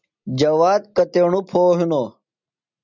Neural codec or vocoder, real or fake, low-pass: none; real; 7.2 kHz